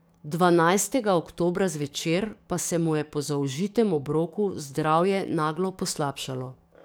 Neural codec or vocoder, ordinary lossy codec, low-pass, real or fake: codec, 44.1 kHz, 7.8 kbps, DAC; none; none; fake